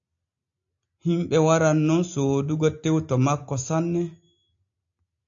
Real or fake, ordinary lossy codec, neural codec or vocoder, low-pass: real; AAC, 64 kbps; none; 7.2 kHz